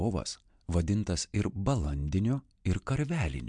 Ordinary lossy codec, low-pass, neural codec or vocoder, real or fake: MP3, 64 kbps; 9.9 kHz; none; real